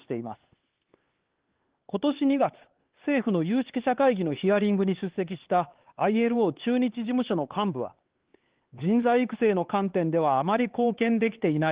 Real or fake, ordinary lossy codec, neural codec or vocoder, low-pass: fake; Opus, 16 kbps; codec, 16 kHz, 4 kbps, X-Codec, HuBERT features, trained on LibriSpeech; 3.6 kHz